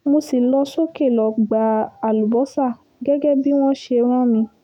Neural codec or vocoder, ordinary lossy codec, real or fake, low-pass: autoencoder, 48 kHz, 128 numbers a frame, DAC-VAE, trained on Japanese speech; none; fake; 19.8 kHz